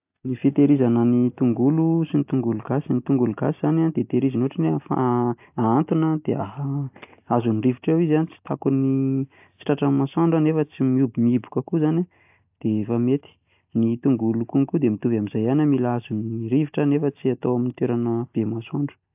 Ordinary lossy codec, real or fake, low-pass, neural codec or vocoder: AAC, 32 kbps; real; 3.6 kHz; none